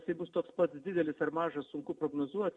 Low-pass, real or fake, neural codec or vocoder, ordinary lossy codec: 10.8 kHz; real; none; MP3, 48 kbps